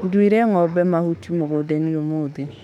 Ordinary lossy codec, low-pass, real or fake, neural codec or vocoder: none; 19.8 kHz; fake; autoencoder, 48 kHz, 32 numbers a frame, DAC-VAE, trained on Japanese speech